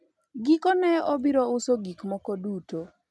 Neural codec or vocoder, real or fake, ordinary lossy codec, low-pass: none; real; none; none